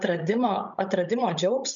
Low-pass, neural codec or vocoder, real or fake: 7.2 kHz; codec, 16 kHz, 16 kbps, FreqCodec, larger model; fake